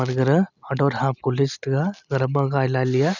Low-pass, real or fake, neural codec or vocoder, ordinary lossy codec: 7.2 kHz; real; none; none